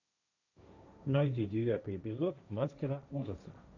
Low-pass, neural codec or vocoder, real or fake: 7.2 kHz; codec, 16 kHz, 1.1 kbps, Voila-Tokenizer; fake